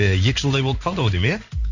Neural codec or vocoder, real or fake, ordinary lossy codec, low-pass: codec, 16 kHz in and 24 kHz out, 1 kbps, XY-Tokenizer; fake; none; 7.2 kHz